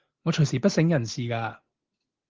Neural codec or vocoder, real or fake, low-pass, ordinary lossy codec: none; real; 7.2 kHz; Opus, 16 kbps